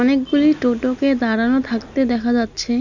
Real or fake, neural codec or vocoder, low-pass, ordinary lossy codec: real; none; 7.2 kHz; none